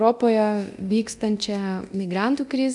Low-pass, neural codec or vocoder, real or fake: 10.8 kHz; codec, 24 kHz, 0.9 kbps, DualCodec; fake